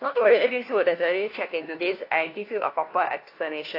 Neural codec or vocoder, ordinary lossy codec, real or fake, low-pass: codec, 16 kHz, 1 kbps, FunCodec, trained on LibriTTS, 50 frames a second; AAC, 32 kbps; fake; 5.4 kHz